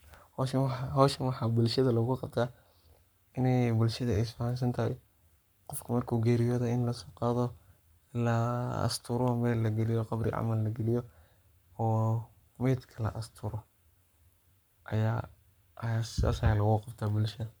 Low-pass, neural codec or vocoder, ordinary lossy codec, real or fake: none; codec, 44.1 kHz, 7.8 kbps, Pupu-Codec; none; fake